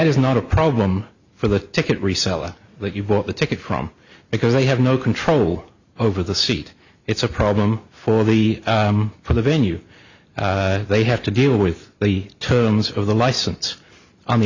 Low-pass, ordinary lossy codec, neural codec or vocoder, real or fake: 7.2 kHz; Opus, 64 kbps; none; real